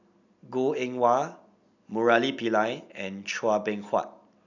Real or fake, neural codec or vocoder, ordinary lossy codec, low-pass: real; none; none; 7.2 kHz